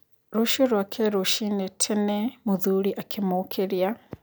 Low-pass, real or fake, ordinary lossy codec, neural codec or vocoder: none; real; none; none